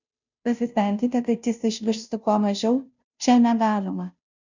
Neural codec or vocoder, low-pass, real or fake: codec, 16 kHz, 0.5 kbps, FunCodec, trained on Chinese and English, 25 frames a second; 7.2 kHz; fake